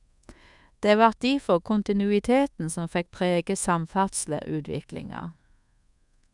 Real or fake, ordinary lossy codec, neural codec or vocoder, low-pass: fake; none; codec, 24 kHz, 1.2 kbps, DualCodec; 10.8 kHz